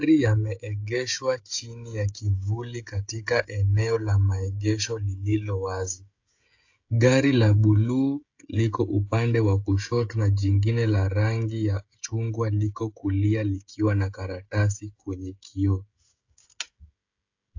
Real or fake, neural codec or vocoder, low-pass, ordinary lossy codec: fake; codec, 16 kHz, 16 kbps, FreqCodec, smaller model; 7.2 kHz; AAC, 48 kbps